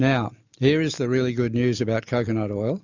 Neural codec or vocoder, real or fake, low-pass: none; real; 7.2 kHz